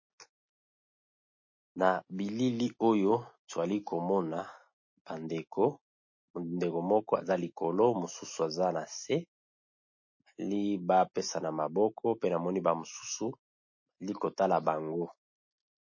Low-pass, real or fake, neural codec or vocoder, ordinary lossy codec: 7.2 kHz; real; none; MP3, 32 kbps